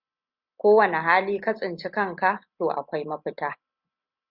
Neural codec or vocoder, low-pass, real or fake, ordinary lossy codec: none; 5.4 kHz; real; Opus, 64 kbps